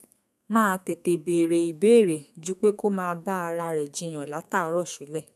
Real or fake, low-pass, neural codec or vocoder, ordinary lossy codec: fake; 14.4 kHz; codec, 32 kHz, 1.9 kbps, SNAC; none